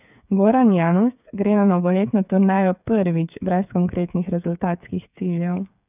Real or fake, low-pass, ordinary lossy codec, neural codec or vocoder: fake; 3.6 kHz; none; codec, 16 kHz, 8 kbps, FreqCodec, smaller model